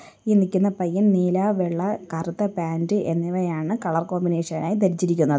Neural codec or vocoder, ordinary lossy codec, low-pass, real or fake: none; none; none; real